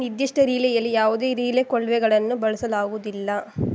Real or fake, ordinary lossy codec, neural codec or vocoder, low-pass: real; none; none; none